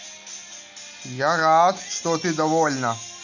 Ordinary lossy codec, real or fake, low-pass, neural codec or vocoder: none; real; 7.2 kHz; none